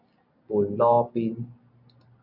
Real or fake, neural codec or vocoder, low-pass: real; none; 5.4 kHz